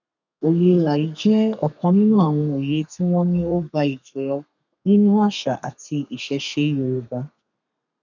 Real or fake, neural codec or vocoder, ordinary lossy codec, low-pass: fake; codec, 32 kHz, 1.9 kbps, SNAC; none; 7.2 kHz